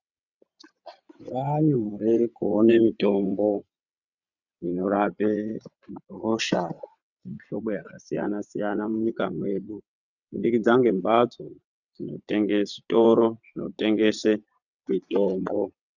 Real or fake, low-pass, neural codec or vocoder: fake; 7.2 kHz; vocoder, 22.05 kHz, 80 mel bands, WaveNeXt